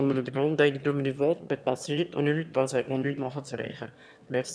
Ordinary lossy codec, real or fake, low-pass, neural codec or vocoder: none; fake; none; autoencoder, 22.05 kHz, a latent of 192 numbers a frame, VITS, trained on one speaker